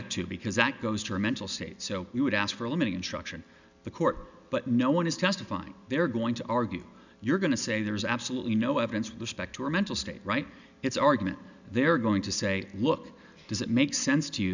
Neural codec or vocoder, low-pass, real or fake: none; 7.2 kHz; real